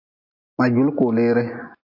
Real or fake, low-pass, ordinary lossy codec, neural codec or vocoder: real; 5.4 kHz; AAC, 32 kbps; none